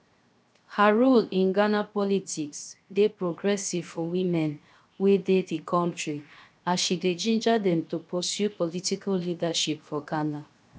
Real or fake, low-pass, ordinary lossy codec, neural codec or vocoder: fake; none; none; codec, 16 kHz, 0.7 kbps, FocalCodec